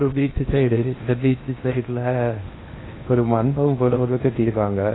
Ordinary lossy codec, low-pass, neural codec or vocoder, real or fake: AAC, 16 kbps; 7.2 kHz; codec, 16 kHz in and 24 kHz out, 0.8 kbps, FocalCodec, streaming, 65536 codes; fake